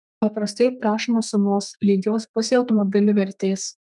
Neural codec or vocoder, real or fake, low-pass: codec, 32 kHz, 1.9 kbps, SNAC; fake; 10.8 kHz